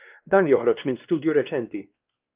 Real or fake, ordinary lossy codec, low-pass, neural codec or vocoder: fake; Opus, 24 kbps; 3.6 kHz; codec, 16 kHz, 2 kbps, X-Codec, WavLM features, trained on Multilingual LibriSpeech